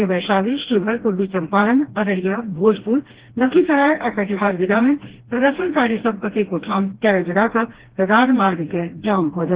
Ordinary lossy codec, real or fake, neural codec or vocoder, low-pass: Opus, 16 kbps; fake; codec, 16 kHz, 1 kbps, FreqCodec, smaller model; 3.6 kHz